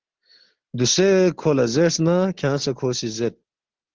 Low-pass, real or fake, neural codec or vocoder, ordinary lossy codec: 7.2 kHz; real; none; Opus, 16 kbps